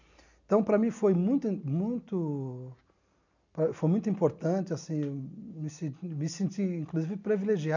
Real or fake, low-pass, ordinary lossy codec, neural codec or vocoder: real; 7.2 kHz; none; none